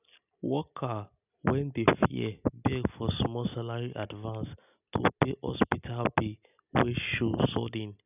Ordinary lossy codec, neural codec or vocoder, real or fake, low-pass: none; none; real; 3.6 kHz